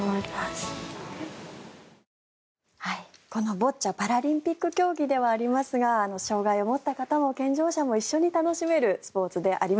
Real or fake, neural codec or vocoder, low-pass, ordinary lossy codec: real; none; none; none